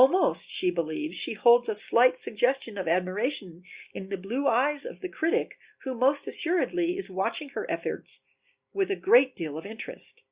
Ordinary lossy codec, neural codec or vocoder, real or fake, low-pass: Opus, 64 kbps; none; real; 3.6 kHz